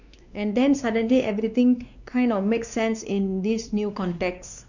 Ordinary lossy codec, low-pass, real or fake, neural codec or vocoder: none; 7.2 kHz; fake; codec, 16 kHz, 2 kbps, X-Codec, WavLM features, trained on Multilingual LibriSpeech